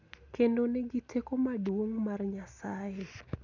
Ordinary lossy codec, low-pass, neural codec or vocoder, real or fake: none; 7.2 kHz; none; real